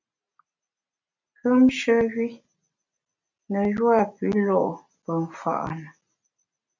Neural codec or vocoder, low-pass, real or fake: none; 7.2 kHz; real